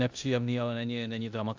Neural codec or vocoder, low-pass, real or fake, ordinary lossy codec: codec, 16 kHz in and 24 kHz out, 0.9 kbps, LongCat-Audio-Codec, four codebook decoder; 7.2 kHz; fake; AAC, 48 kbps